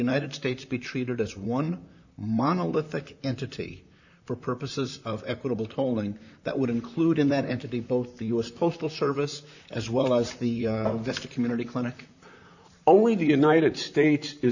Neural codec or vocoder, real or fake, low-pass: vocoder, 44.1 kHz, 128 mel bands, Pupu-Vocoder; fake; 7.2 kHz